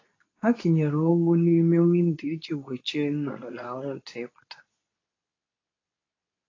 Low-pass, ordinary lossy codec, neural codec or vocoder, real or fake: 7.2 kHz; AAC, 32 kbps; codec, 24 kHz, 0.9 kbps, WavTokenizer, medium speech release version 2; fake